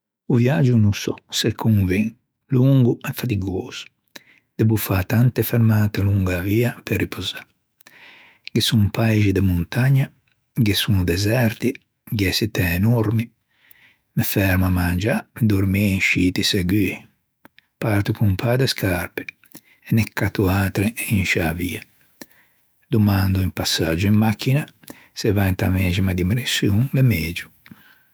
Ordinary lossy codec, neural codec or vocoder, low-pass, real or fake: none; autoencoder, 48 kHz, 128 numbers a frame, DAC-VAE, trained on Japanese speech; none; fake